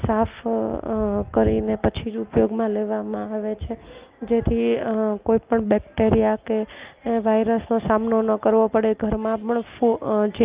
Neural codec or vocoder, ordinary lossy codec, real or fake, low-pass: none; Opus, 32 kbps; real; 3.6 kHz